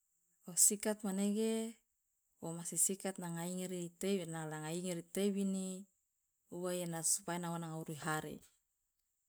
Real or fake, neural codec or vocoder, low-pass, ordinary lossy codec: real; none; none; none